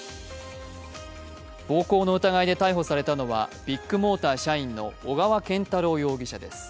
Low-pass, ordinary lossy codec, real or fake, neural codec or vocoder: none; none; real; none